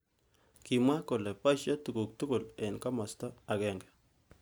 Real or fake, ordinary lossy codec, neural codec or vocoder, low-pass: real; none; none; none